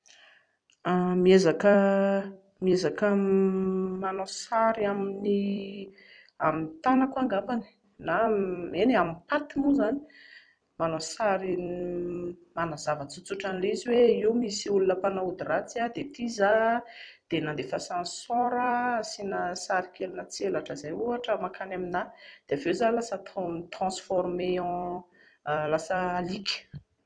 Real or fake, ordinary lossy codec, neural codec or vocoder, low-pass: fake; none; vocoder, 44.1 kHz, 128 mel bands every 256 samples, BigVGAN v2; 9.9 kHz